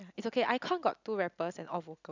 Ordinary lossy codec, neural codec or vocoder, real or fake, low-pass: none; none; real; 7.2 kHz